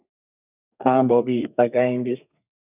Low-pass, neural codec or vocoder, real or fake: 3.6 kHz; codec, 32 kHz, 1.9 kbps, SNAC; fake